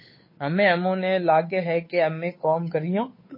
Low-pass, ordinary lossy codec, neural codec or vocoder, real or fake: 5.4 kHz; MP3, 24 kbps; codec, 16 kHz, 8 kbps, FunCodec, trained on Chinese and English, 25 frames a second; fake